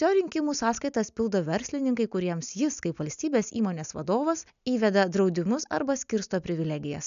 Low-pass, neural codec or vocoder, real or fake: 7.2 kHz; none; real